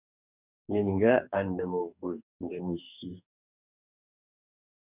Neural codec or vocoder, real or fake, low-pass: codec, 44.1 kHz, 2.6 kbps, DAC; fake; 3.6 kHz